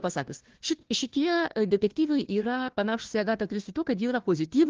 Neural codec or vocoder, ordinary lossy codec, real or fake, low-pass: codec, 16 kHz, 1 kbps, FunCodec, trained on Chinese and English, 50 frames a second; Opus, 16 kbps; fake; 7.2 kHz